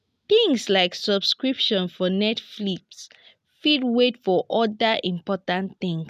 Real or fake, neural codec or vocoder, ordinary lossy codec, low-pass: real; none; none; 14.4 kHz